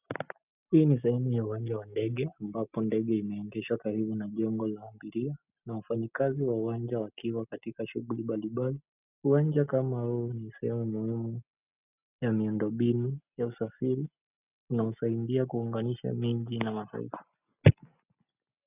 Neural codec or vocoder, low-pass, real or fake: none; 3.6 kHz; real